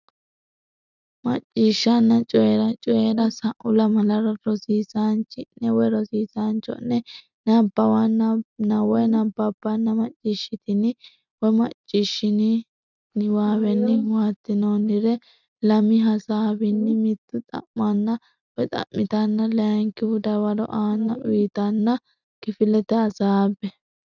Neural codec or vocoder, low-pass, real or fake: none; 7.2 kHz; real